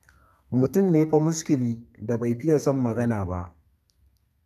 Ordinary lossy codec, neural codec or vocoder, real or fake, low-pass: none; codec, 32 kHz, 1.9 kbps, SNAC; fake; 14.4 kHz